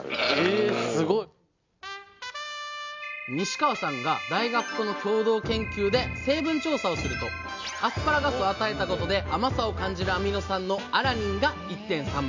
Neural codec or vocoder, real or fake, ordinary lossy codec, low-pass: none; real; MP3, 64 kbps; 7.2 kHz